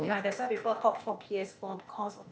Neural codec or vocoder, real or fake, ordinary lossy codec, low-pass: codec, 16 kHz, 0.8 kbps, ZipCodec; fake; none; none